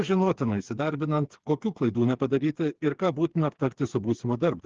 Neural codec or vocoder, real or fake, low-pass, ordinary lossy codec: codec, 16 kHz, 4 kbps, FreqCodec, smaller model; fake; 7.2 kHz; Opus, 16 kbps